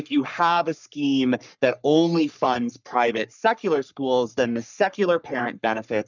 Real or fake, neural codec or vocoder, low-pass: fake; codec, 44.1 kHz, 3.4 kbps, Pupu-Codec; 7.2 kHz